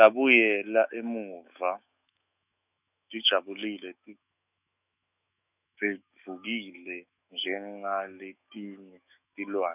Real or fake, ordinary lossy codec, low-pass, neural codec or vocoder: fake; none; 3.6 kHz; autoencoder, 48 kHz, 128 numbers a frame, DAC-VAE, trained on Japanese speech